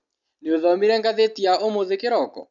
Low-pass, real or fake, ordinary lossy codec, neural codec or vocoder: 7.2 kHz; real; none; none